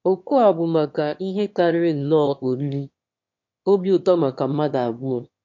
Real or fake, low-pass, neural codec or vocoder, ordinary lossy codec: fake; 7.2 kHz; autoencoder, 22.05 kHz, a latent of 192 numbers a frame, VITS, trained on one speaker; MP3, 48 kbps